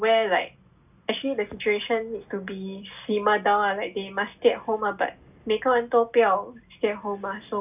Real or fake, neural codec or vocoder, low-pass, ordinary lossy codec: real; none; 3.6 kHz; none